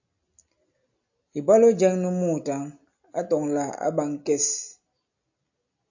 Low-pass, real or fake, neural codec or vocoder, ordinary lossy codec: 7.2 kHz; real; none; AAC, 48 kbps